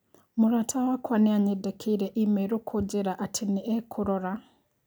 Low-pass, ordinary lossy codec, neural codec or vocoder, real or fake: none; none; none; real